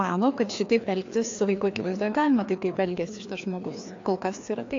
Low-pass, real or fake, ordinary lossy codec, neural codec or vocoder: 7.2 kHz; fake; AAC, 48 kbps; codec, 16 kHz, 2 kbps, FreqCodec, larger model